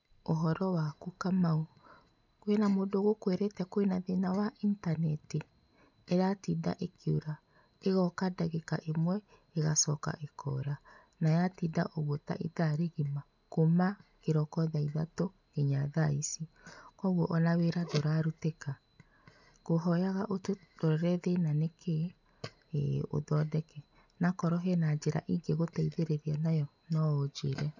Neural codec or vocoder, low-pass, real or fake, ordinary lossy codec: none; 7.2 kHz; real; none